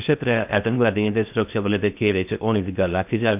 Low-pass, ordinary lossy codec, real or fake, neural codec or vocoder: 3.6 kHz; AAC, 32 kbps; fake; codec, 16 kHz in and 24 kHz out, 0.6 kbps, FocalCodec, streaming, 2048 codes